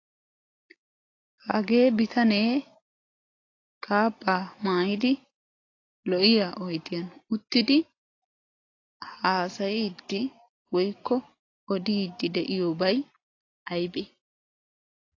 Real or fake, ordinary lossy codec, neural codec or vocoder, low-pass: real; AAC, 32 kbps; none; 7.2 kHz